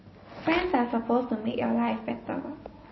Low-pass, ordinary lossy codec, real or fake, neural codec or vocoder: 7.2 kHz; MP3, 24 kbps; real; none